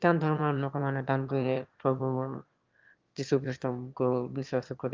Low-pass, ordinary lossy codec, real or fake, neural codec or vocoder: 7.2 kHz; Opus, 24 kbps; fake; autoencoder, 22.05 kHz, a latent of 192 numbers a frame, VITS, trained on one speaker